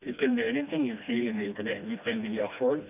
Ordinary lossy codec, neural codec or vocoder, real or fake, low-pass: none; codec, 16 kHz, 1 kbps, FreqCodec, smaller model; fake; 3.6 kHz